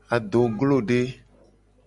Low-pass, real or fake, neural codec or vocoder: 10.8 kHz; real; none